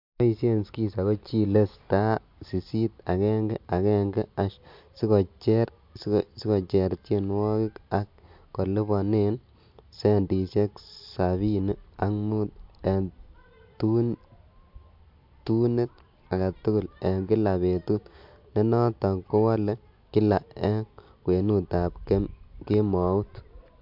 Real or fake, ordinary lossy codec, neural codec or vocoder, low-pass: real; MP3, 48 kbps; none; 5.4 kHz